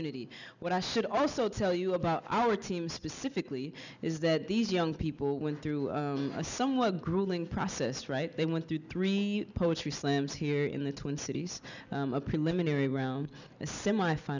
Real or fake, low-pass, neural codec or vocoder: real; 7.2 kHz; none